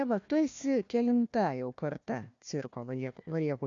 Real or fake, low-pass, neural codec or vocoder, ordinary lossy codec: fake; 7.2 kHz; codec, 16 kHz, 1 kbps, FunCodec, trained on Chinese and English, 50 frames a second; AAC, 64 kbps